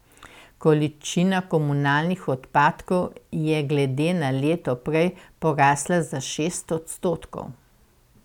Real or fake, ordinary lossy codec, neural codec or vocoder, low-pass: real; none; none; 19.8 kHz